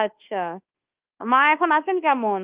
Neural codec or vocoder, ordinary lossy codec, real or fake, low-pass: codec, 24 kHz, 1.2 kbps, DualCodec; Opus, 24 kbps; fake; 3.6 kHz